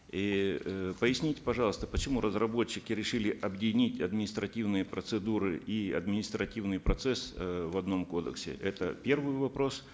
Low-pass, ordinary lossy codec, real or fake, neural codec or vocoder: none; none; real; none